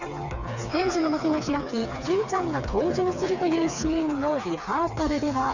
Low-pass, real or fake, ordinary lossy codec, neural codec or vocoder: 7.2 kHz; fake; none; codec, 16 kHz, 4 kbps, FreqCodec, smaller model